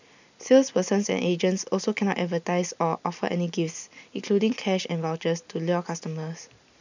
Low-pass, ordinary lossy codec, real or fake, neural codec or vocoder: 7.2 kHz; none; real; none